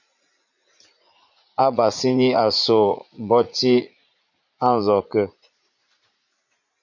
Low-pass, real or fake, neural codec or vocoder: 7.2 kHz; fake; vocoder, 44.1 kHz, 80 mel bands, Vocos